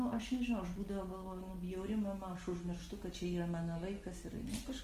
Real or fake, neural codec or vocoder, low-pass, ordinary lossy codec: real; none; 14.4 kHz; Opus, 24 kbps